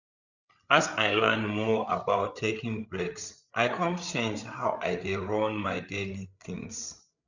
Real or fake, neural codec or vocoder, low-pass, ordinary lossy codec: fake; codec, 16 kHz, 8 kbps, FreqCodec, larger model; 7.2 kHz; none